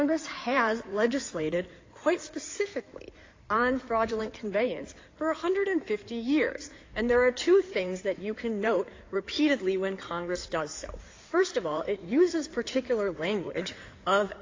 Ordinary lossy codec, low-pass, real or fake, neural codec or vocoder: AAC, 32 kbps; 7.2 kHz; fake; codec, 16 kHz in and 24 kHz out, 2.2 kbps, FireRedTTS-2 codec